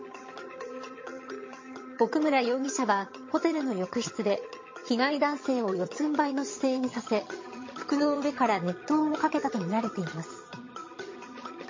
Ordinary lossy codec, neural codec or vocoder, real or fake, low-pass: MP3, 32 kbps; vocoder, 22.05 kHz, 80 mel bands, HiFi-GAN; fake; 7.2 kHz